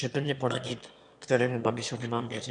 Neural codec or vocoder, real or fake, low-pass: autoencoder, 22.05 kHz, a latent of 192 numbers a frame, VITS, trained on one speaker; fake; 9.9 kHz